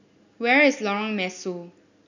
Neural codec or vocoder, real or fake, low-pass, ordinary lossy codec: none; real; 7.2 kHz; none